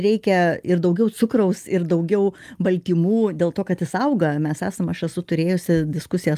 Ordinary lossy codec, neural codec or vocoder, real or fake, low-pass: Opus, 32 kbps; none; real; 14.4 kHz